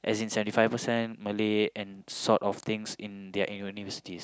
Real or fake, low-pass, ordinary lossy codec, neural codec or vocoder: real; none; none; none